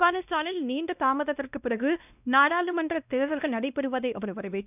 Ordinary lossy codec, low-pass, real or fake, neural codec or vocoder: none; 3.6 kHz; fake; codec, 16 kHz, 1 kbps, X-Codec, WavLM features, trained on Multilingual LibriSpeech